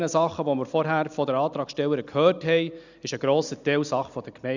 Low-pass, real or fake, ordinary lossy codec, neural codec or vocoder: 7.2 kHz; real; none; none